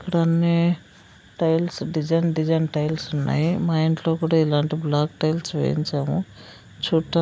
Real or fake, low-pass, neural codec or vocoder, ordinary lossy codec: real; none; none; none